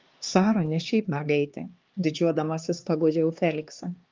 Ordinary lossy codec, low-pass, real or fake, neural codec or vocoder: Opus, 32 kbps; 7.2 kHz; fake; codec, 16 kHz, 2 kbps, X-Codec, HuBERT features, trained on LibriSpeech